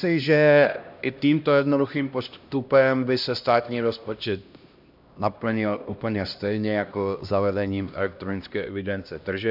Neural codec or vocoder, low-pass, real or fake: codec, 16 kHz, 1 kbps, X-Codec, HuBERT features, trained on LibriSpeech; 5.4 kHz; fake